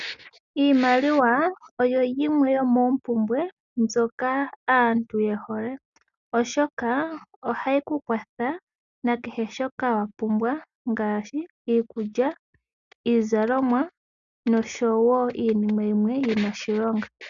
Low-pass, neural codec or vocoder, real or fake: 7.2 kHz; none; real